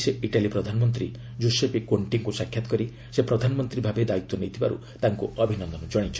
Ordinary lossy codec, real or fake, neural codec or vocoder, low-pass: none; real; none; none